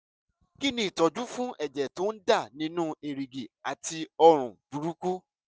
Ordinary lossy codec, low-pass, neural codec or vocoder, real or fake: none; none; none; real